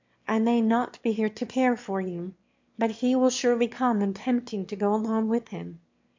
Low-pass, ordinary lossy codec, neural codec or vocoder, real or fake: 7.2 kHz; MP3, 48 kbps; autoencoder, 22.05 kHz, a latent of 192 numbers a frame, VITS, trained on one speaker; fake